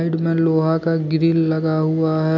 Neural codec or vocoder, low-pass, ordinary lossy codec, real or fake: none; 7.2 kHz; none; real